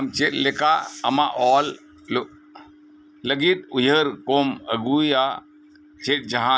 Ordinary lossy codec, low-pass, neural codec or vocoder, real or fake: none; none; none; real